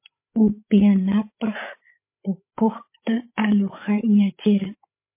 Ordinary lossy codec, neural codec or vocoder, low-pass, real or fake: MP3, 24 kbps; codec, 16 kHz, 8 kbps, FreqCodec, larger model; 3.6 kHz; fake